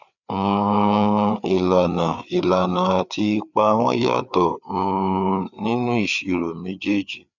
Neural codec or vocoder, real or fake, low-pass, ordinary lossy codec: codec, 16 kHz, 4 kbps, FreqCodec, larger model; fake; 7.2 kHz; none